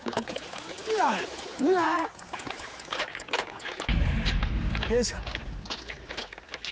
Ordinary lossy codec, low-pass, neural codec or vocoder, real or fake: none; none; codec, 16 kHz, 4 kbps, X-Codec, HuBERT features, trained on general audio; fake